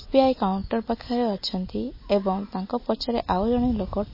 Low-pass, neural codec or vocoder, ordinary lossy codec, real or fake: 5.4 kHz; none; MP3, 24 kbps; real